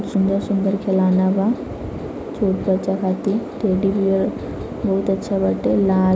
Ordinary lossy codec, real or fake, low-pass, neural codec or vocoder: none; real; none; none